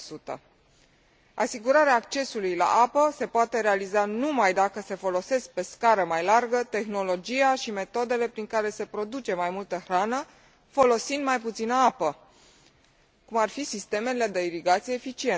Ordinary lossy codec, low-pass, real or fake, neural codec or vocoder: none; none; real; none